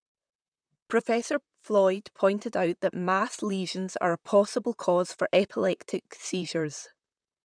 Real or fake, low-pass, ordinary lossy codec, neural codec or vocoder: fake; 9.9 kHz; none; vocoder, 44.1 kHz, 128 mel bands, Pupu-Vocoder